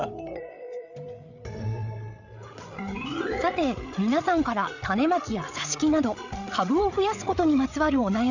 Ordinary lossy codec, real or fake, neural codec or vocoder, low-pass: none; fake; codec, 16 kHz, 8 kbps, FreqCodec, larger model; 7.2 kHz